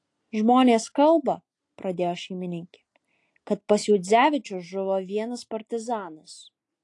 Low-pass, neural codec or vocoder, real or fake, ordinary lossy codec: 10.8 kHz; none; real; AAC, 48 kbps